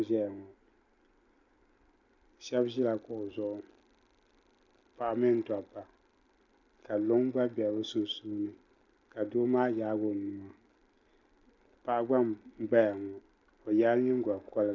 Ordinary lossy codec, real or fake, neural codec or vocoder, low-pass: Opus, 64 kbps; real; none; 7.2 kHz